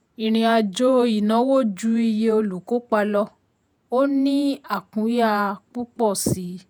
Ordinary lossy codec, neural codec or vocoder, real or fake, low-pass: none; vocoder, 48 kHz, 128 mel bands, Vocos; fake; none